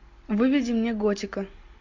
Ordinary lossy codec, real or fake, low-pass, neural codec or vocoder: MP3, 48 kbps; real; 7.2 kHz; none